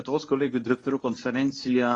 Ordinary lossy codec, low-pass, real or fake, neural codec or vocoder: AAC, 32 kbps; 10.8 kHz; fake; codec, 24 kHz, 0.9 kbps, WavTokenizer, medium speech release version 1